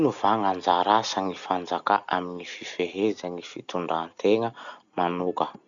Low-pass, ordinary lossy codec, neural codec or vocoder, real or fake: 7.2 kHz; none; none; real